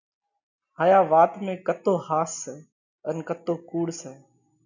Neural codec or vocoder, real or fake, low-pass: none; real; 7.2 kHz